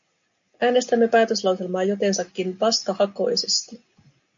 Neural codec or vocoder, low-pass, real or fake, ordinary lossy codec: none; 7.2 kHz; real; MP3, 64 kbps